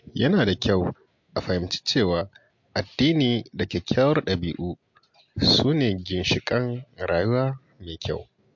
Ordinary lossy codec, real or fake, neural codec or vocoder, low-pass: MP3, 48 kbps; real; none; 7.2 kHz